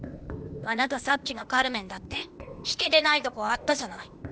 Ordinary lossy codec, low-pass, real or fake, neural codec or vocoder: none; none; fake; codec, 16 kHz, 0.8 kbps, ZipCodec